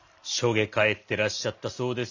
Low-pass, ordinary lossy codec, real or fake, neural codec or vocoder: 7.2 kHz; none; real; none